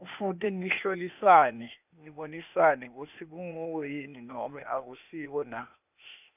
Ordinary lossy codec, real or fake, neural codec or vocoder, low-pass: none; fake; codec, 16 kHz, 0.8 kbps, ZipCodec; 3.6 kHz